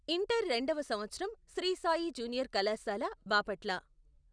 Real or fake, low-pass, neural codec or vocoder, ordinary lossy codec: real; 9.9 kHz; none; none